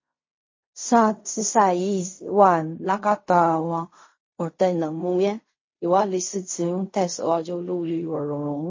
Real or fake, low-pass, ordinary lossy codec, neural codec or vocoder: fake; 7.2 kHz; MP3, 32 kbps; codec, 16 kHz in and 24 kHz out, 0.4 kbps, LongCat-Audio-Codec, fine tuned four codebook decoder